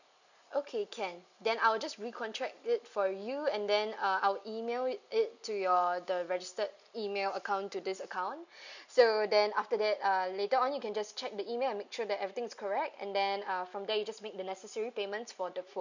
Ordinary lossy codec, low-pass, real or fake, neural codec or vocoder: none; 7.2 kHz; real; none